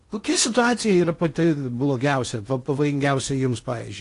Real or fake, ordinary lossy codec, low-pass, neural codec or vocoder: fake; AAC, 48 kbps; 10.8 kHz; codec, 16 kHz in and 24 kHz out, 0.8 kbps, FocalCodec, streaming, 65536 codes